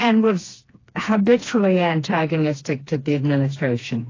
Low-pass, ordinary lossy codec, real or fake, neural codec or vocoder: 7.2 kHz; AAC, 32 kbps; fake; codec, 16 kHz, 2 kbps, FreqCodec, smaller model